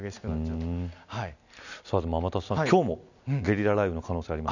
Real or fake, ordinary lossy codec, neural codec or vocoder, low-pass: real; none; none; 7.2 kHz